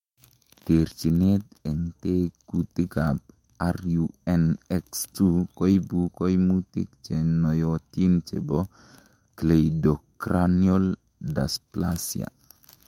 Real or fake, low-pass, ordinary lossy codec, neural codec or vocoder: fake; 19.8 kHz; MP3, 64 kbps; autoencoder, 48 kHz, 128 numbers a frame, DAC-VAE, trained on Japanese speech